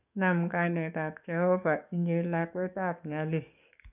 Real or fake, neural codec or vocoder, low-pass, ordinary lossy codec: fake; codec, 44.1 kHz, 7.8 kbps, DAC; 3.6 kHz; none